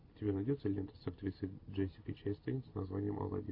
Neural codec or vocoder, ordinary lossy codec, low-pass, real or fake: none; AAC, 32 kbps; 5.4 kHz; real